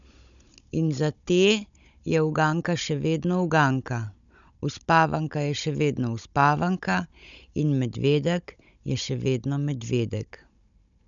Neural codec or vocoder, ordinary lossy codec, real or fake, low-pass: codec, 16 kHz, 16 kbps, FunCodec, trained on Chinese and English, 50 frames a second; none; fake; 7.2 kHz